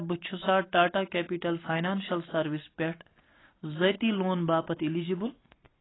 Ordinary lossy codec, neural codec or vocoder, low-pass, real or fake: AAC, 16 kbps; none; 7.2 kHz; real